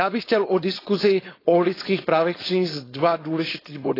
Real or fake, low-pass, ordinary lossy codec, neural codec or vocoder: fake; 5.4 kHz; AAC, 24 kbps; codec, 16 kHz, 4.8 kbps, FACodec